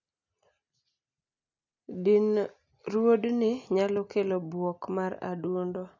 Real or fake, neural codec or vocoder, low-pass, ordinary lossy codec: real; none; 7.2 kHz; none